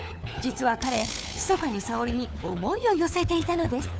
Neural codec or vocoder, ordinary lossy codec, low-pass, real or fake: codec, 16 kHz, 4 kbps, FunCodec, trained on LibriTTS, 50 frames a second; none; none; fake